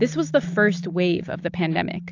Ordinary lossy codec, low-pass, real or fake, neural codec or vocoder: AAC, 48 kbps; 7.2 kHz; real; none